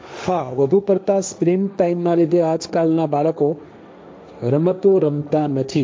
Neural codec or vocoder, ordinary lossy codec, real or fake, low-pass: codec, 16 kHz, 1.1 kbps, Voila-Tokenizer; none; fake; none